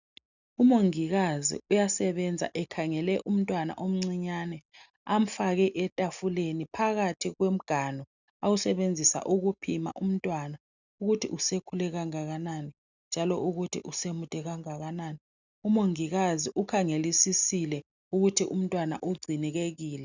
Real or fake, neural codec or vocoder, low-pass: real; none; 7.2 kHz